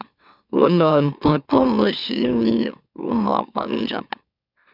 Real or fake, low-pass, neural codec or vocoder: fake; 5.4 kHz; autoencoder, 44.1 kHz, a latent of 192 numbers a frame, MeloTTS